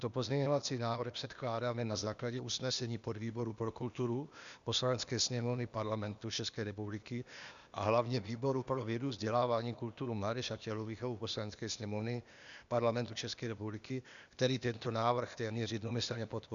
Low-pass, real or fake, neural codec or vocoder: 7.2 kHz; fake; codec, 16 kHz, 0.8 kbps, ZipCodec